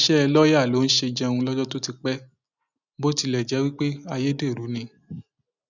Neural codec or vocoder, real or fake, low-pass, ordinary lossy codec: none; real; 7.2 kHz; none